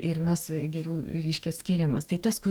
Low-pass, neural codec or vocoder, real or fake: 19.8 kHz; codec, 44.1 kHz, 2.6 kbps, DAC; fake